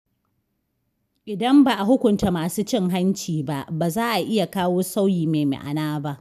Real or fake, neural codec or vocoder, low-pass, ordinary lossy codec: real; none; 14.4 kHz; none